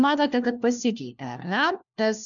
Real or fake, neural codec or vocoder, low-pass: fake; codec, 16 kHz, 1 kbps, FunCodec, trained on LibriTTS, 50 frames a second; 7.2 kHz